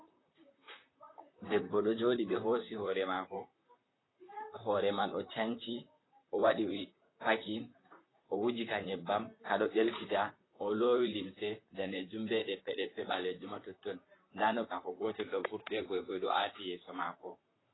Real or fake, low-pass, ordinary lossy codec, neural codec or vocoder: fake; 7.2 kHz; AAC, 16 kbps; vocoder, 44.1 kHz, 128 mel bands, Pupu-Vocoder